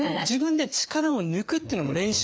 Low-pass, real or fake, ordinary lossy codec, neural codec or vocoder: none; fake; none; codec, 16 kHz, 4 kbps, FreqCodec, larger model